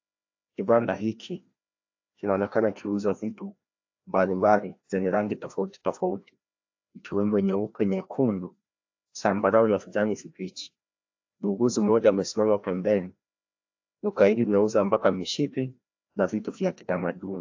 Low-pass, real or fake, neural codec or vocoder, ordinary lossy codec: 7.2 kHz; fake; codec, 16 kHz, 1 kbps, FreqCodec, larger model; AAC, 48 kbps